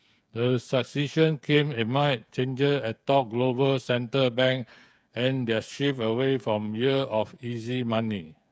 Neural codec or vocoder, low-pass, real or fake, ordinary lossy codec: codec, 16 kHz, 8 kbps, FreqCodec, smaller model; none; fake; none